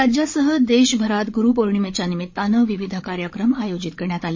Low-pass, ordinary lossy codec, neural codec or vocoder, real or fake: 7.2 kHz; MP3, 32 kbps; codec, 16 kHz, 8 kbps, FreqCodec, larger model; fake